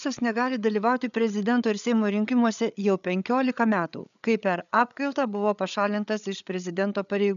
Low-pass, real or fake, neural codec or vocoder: 7.2 kHz; fake; codec, 16 kHz, 8 kbps, FreqCodec, larger model